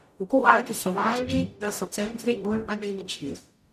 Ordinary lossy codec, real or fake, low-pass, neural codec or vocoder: none; fake; 14.4 kHz; codec, 44.1 kHz, 0.9 kbps, DAC